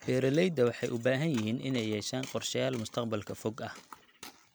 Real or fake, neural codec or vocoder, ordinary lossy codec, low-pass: real; none; none; none